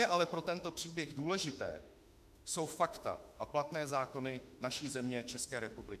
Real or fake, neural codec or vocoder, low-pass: fake; autoencoder, 48 kHz, 32 numbers a frame, DAC-VAE, trained on Japanese speech; 14.4 kHz